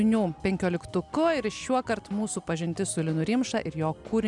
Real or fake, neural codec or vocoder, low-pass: real; none; 10.8 kHz